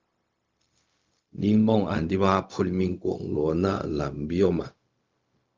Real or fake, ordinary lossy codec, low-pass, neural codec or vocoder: fake; Opus, 24 kbps; 7.2 kHz; codec, 16 kHz, 0.4 kbps, LongCat-Audio-Codec